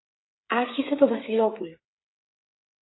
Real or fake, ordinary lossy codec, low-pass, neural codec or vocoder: fake; AAC, 16 kbps; 7.2 kHz; codec, 16 kHz, 8 kbps, FreqCodec, smaller model